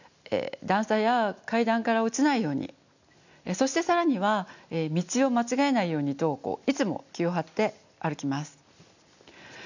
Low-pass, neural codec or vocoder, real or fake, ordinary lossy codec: 7.2 kHz; none; real; none